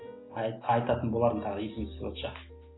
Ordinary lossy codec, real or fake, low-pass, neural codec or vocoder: AAC, 16 kbps; real; 7.2 kHz; none